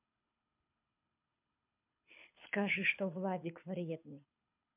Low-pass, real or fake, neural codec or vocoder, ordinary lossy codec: 3.6 kHz; fake; codec, 24 kHz, 6 kbps, HILCodec; MP3, 32 kbps